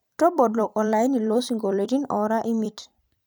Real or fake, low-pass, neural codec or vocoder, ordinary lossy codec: fake; none; vocoder, 44.1 kHz, 128 mel bands every 256 samples, BigVGAN v2; none